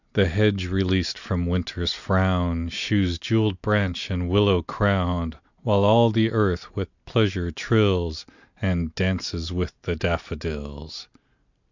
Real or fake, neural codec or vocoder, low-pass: real; none; 7.2 kHz